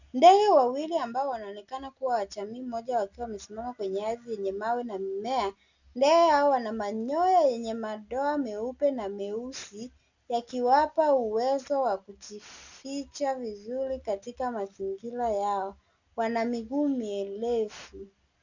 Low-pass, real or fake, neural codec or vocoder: 7.2 kHz; real; none